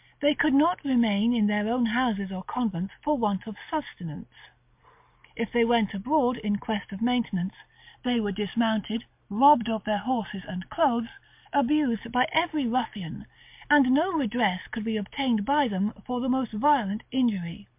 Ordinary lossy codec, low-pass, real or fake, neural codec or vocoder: MP3, 32 kbps; 3.6 kHz; real; none